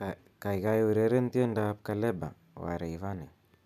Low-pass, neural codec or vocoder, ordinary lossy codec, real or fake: 14.4 kHz; none; none; real